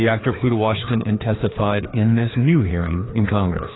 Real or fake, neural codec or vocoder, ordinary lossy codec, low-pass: fake; codec, 16 kHz, 2 kbps, FreqCodec, larger model; AAC, 16 kbps; 7.2 kHz